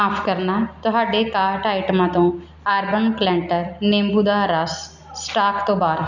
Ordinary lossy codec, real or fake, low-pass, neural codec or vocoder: none; real; 7.2 kHz; none